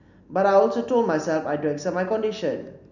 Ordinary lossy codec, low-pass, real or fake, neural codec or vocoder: none; 7.2 kHz; real; none